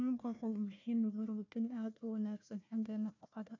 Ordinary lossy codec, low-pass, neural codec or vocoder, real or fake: none; 7.2 kHz; codec, 16 kHz, 1 kbps, FunCodec, trained on Chinese and English, 50 frames a second; fake